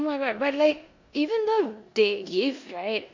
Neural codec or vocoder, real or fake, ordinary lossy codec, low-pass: codec, 16 kHz in and 24 kHz out, 0.9 kbps, LongCat-Audio-Codec, four codebook decoder; fake; MP3, 48 kbps; 7.2 kHz